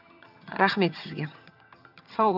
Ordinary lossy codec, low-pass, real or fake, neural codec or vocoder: AAC, 48 kbps; 5.4 kHz; fake; vocoder, 22.05 kHz, 80 mel bands, HiFi-GAN